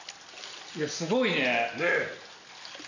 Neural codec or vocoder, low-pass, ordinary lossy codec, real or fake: none; 7.2 kHz; none; real